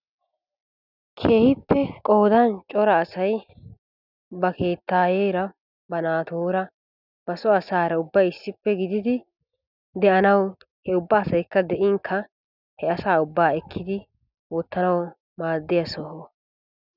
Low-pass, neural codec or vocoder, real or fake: 5.4 kHz; none; real